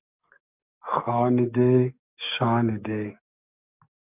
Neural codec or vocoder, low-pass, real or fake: codec, 44.1 kHz, 7.8 kbps, DAC; 3.6 kHz; fake